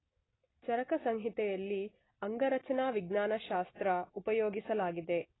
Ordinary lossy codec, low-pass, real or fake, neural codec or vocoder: AAC, 16 kbps; 7.2 kHz; real; none